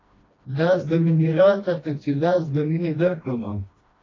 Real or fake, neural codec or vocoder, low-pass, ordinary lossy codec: fake; codec, 16 kHz, 1 kbps, FreqCodec, smaller model; 7.2 kHz; AAC, 32 kbps